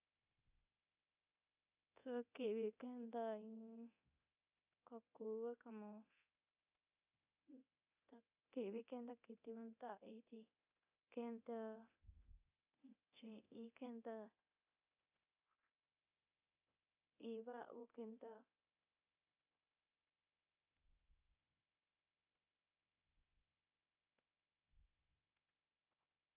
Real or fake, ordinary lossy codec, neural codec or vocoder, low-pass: fake; none; codec, 24 kHz, 0.9 kbps, DualCodec; 3.6 kHz